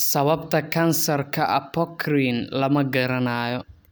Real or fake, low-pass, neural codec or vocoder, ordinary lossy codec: real; none; none; none